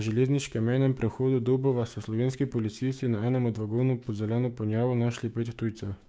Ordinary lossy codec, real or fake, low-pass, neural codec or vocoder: none; fake; none; codec, 16 kHz, 6 kbps, DAC